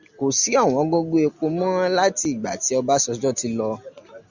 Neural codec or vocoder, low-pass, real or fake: none; 7.2 kHz; real